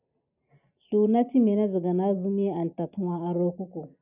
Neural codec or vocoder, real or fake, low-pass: none; real; 3.6 kHz